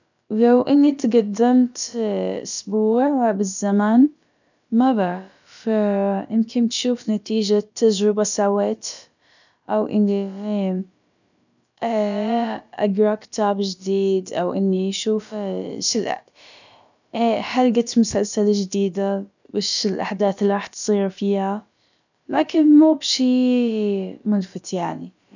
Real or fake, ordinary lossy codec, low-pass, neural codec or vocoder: fake; none; 7.2 kHz; codec, 16 kHz, about 1 kbps, DyCAST, with the encoder's durations